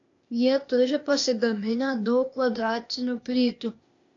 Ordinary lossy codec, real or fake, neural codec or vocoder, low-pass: AAC, 48 kbps; fake; codec, 16 kHz, 0.8 kbps, ZipCodec; 7.2 kHz